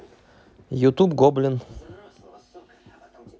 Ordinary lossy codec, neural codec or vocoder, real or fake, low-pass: none; none; real; none